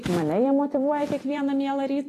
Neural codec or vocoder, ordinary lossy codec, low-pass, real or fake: vocoder, 44.1 kHz, 128 mel bands every 256 samples, BigVGAN v2; AAC, 48 kbps; 14.4 kHz; fake